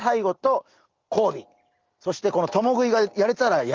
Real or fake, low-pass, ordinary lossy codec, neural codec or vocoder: real; 7.2 kHz; Opus, 32 kbps; none